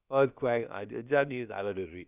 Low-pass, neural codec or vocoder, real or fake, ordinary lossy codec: 3.6 kHz; codec, 16 kHz, about 1 kbps, DyCAST, with the encoder's durations; fake; none